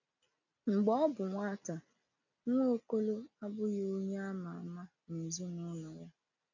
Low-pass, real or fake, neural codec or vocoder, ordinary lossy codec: 7.2 kHz; real; none; none